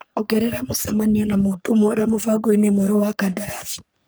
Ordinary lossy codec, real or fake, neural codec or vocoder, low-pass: none; fake; codec, 44.1 kHz, 3.4 kbps, Pupu-Codec; none